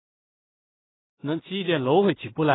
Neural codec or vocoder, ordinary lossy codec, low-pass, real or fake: codec, 16 kHz in and 24 kHz out, 0.4 kbps, LongCat-Audio-Codec, two codebook decoder; AAC, 16 kbps; 7.2 kHz; fake